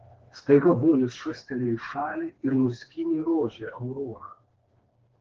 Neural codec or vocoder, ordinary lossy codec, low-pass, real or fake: codec, 16 kHz, 2 kbps, FreqCodec, smaller model; Opus, 16 kbps; 7.2 kHz; fake